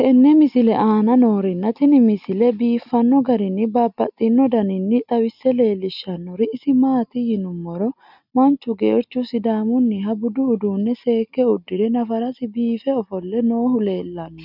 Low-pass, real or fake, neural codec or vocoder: 5.4 kHz; real; none